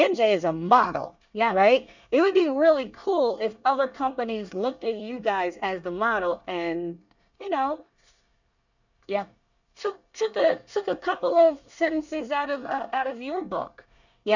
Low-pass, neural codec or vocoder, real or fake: 7.2 kHz; codec, 24 kHz, 1 kbps, SNAC; fake